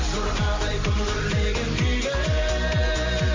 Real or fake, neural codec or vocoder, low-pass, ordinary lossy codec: real; none; 7.2 kHz; MP3, 48 kbps